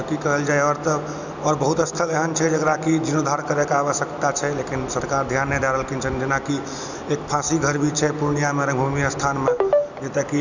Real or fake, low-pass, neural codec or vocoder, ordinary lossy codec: real; 7.2 kHz; none; none